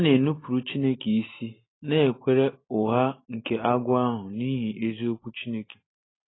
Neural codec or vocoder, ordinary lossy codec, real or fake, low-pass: none; AAC, 16 kbps; real; 7.2 kHz